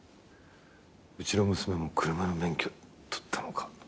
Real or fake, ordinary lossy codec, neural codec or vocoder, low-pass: real; none; none; none